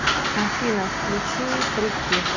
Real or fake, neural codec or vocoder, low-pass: real; none; 7.2 kHz